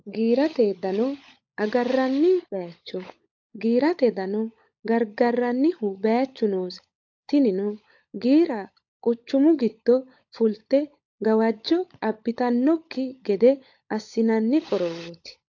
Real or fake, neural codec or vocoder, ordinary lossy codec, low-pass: fake; codec, 16 kHz, 16 kbps, FunCodec, trained on LibriTTS, 50 frames a second; AAC, 48 kbps; 7.2 kHz